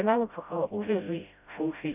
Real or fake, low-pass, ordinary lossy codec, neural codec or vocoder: fake; 3.6 kHz; none; codec, 16 kHz, 0.5 kbps, FreqCodec, smaller model